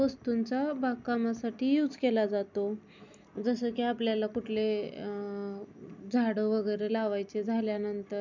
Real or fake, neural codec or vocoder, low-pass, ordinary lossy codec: real; none; 7.2 kHz; none